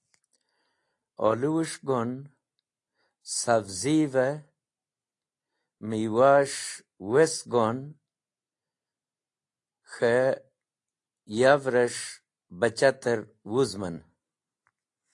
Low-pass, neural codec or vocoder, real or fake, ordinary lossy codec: 10.8 kHz; none; real; AAC, 48 kbps